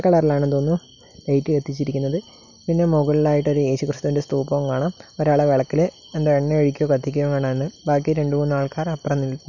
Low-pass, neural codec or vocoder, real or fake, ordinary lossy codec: 7.2 kHz; none; real; none